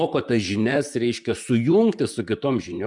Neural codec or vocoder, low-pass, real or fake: vocoder, 44.1 kHz, 128 mel bands, Pupu-Vocoder; 10.8 kHz; fake